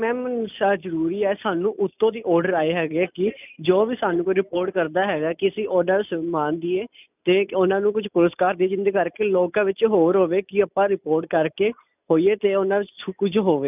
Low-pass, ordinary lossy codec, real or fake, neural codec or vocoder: 3.6 kHz; none; real; none